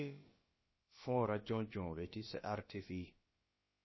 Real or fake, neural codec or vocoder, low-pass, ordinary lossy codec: fake; codec, 16 kHz, about 1 kbps, DyCAST, with the encoder's durations; 7.2 kHz; MP3, 24 kbps